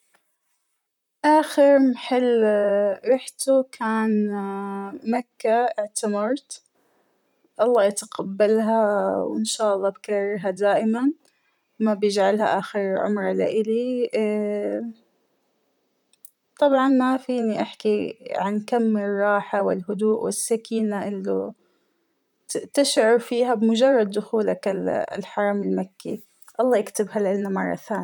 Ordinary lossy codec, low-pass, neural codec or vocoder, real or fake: none; 19.8 kHz; vocoder, 44.1 kHz, 128 mel bands, Pupu-Vocoder; fake